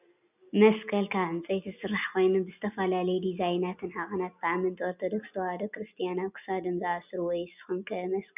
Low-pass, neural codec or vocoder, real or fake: 3.6 kHz; none; real